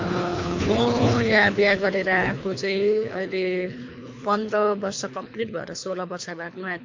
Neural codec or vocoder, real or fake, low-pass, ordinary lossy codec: codec, 24 kHz, 3 kbps, HILCodec; fake; 7.2 kHz; MP3, 48 kbps